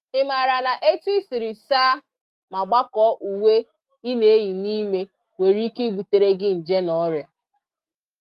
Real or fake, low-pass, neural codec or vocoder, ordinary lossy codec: fake; 14.4 kHz; autoencoder, 48 kHz, 128 numbers a frame, DAC-VAE, trained on Japanese speech; Opus, 32 kbps